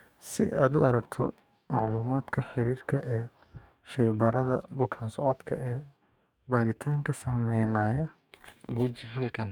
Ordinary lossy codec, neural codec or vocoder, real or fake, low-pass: none; codec, 44.1 kHz, 2.6 kbps, DAC; fake; 19.8 kHz